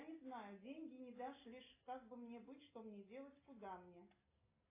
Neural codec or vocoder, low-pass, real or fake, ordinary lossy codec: none; 3.6 kHz; real; AAC, 24 kbps